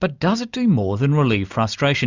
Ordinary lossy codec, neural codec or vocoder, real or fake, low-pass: Opus, 64 kbps; none; real; 7.2 kHz